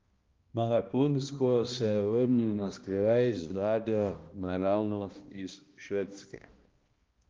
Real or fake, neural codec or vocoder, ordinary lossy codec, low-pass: fake; codec, 16 kHz, 1 kbps, X-Codec, HuBERT features, trained on balanced general audio; Opus, 32 kbps; 7.2 kHz